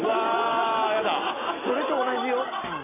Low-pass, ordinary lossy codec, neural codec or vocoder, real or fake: 3.6 kHz; AAC, 32 kbps; none; real